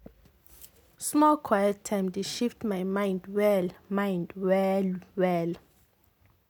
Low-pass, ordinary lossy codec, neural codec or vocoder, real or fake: none; none; none; real